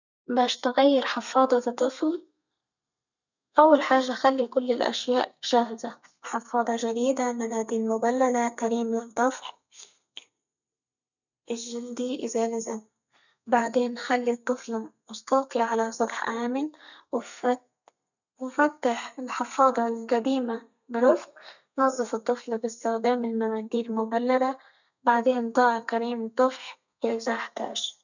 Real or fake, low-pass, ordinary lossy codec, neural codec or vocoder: fake; 7.2 kHz; none; codec, 32 kHz, 1.9 kbps, SNAC